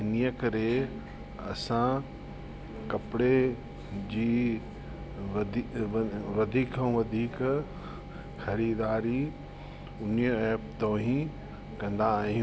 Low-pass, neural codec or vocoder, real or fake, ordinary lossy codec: none; none; real; none